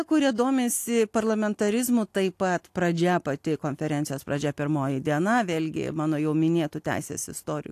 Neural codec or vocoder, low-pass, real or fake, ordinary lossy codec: none; 14.4 kHz; real; AAC, 64 kbps